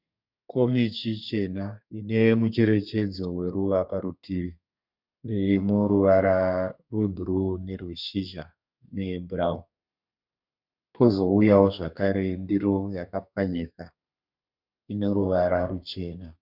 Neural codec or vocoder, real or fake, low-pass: codec, 32 kHz, 1.9 kbps, SNAC; fake; 5.4 kHz